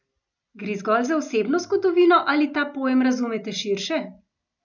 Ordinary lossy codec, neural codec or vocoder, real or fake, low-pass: none; none; real; 7.2 kHz